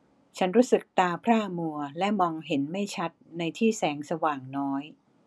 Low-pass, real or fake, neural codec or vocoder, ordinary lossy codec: none; real; none; none